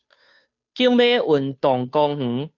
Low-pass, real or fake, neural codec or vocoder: 7.2 kHz; fake; codec, 16 kHz, 2 kbps, FunCodec, trained on Chinese and English, 25 frames a second